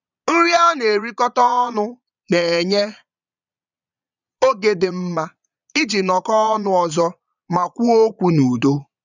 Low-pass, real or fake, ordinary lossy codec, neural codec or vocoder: 7.2 kHz; fake; none; vocoder, 22.05 kHz, 80 mel bands, Vocos